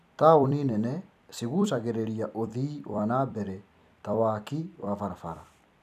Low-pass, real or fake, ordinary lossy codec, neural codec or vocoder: 14.4 kHz; fake; none; vocoder, 44.1 kHz, 128 mel bands every 256 samples, BigVGAN v2